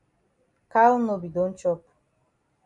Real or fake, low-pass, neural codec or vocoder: real; 10.8 kHz; none